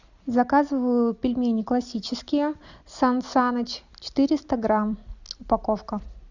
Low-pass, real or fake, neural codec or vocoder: 7.2 kHz; real; none